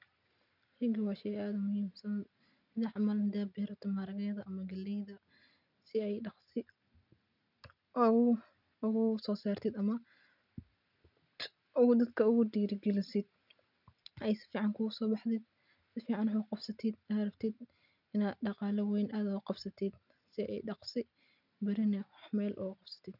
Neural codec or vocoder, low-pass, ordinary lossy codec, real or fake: none; 5.4 kHz; none; real